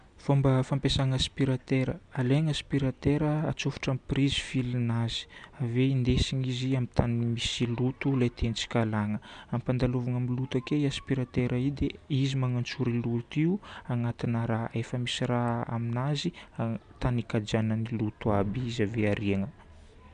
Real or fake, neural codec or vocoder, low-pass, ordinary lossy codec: real; none; 9.9 kHz; none